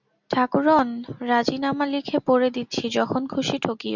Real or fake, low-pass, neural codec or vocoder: real; 7.2 kHz; none